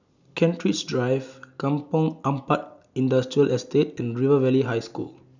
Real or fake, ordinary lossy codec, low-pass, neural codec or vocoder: real; none; 7.2 kHz; none